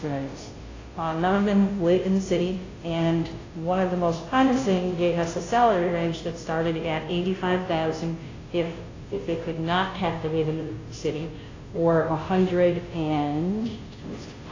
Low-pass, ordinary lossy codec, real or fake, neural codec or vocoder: 7.2 kHz; AAC, 32 kbps; fake; codec, 16 kHz, 0.5 kbps, FunCodec, trained on Chinese and English, 25 frames a second